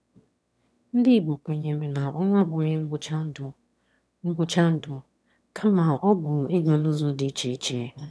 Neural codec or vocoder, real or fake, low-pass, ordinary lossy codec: autoencoder, 22.05 kHz, a latent of 192 numbers a frame, VITS, trained on one speaker; fake; none; none